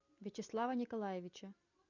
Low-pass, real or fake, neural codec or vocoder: 7.2 kHz; real; none